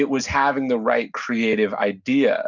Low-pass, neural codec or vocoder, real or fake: 7.2 kHz; none; real